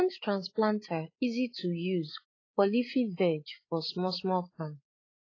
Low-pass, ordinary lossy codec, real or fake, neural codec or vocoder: 7.2 kHz; AAC, 32 kbps; fake; codec, 16 kHz, 8 kbps, FreqCodec, larger model